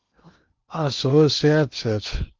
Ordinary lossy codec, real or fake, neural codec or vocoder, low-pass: Opus, 24 kbps; fake; codec, 16 kHz in and 24 kHz out, 0.8 kbps, FocalCodec, streaming, 65536 codes; 7.2 kHz